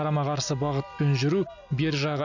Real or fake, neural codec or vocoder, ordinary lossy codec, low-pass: real; none; none; 7.2 kHz